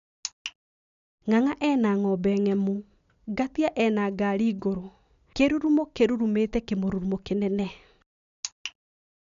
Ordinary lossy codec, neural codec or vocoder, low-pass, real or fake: none; none; 7.2 kHz; real